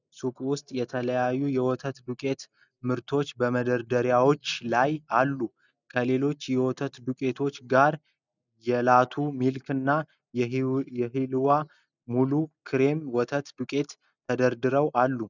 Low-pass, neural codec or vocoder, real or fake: 7.2 kHz; none; real